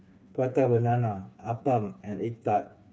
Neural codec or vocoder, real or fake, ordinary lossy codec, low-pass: codec, 16 kHz, 4 kbps, FreqCodec, smaller model; fake; none; none